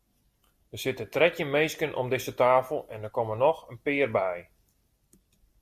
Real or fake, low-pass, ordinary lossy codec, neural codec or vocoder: real; 14.4 kHz; Opus, 64 kbps; none